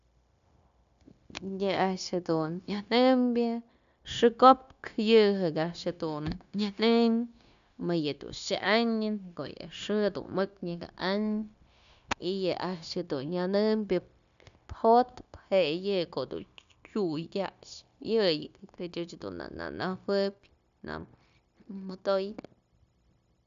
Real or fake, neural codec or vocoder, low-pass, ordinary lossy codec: fake; codec, 16 kHz, 0.9 kbps, LongCat-Audio-Codec; 7.2 kHz; AAC, 96 kbps